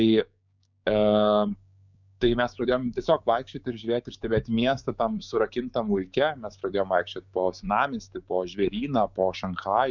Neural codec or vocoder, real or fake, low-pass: codec, 16 kHz, 6 kbps, DAC; fake; 7.2 kHz